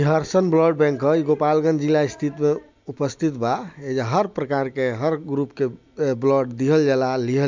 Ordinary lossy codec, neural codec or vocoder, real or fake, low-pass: MP3, 64 kbps; none; real; 7.2 kHz